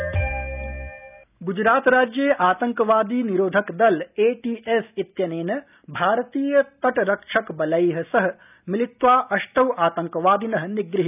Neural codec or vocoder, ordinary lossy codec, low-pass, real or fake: none; none; 3.6 kHz; real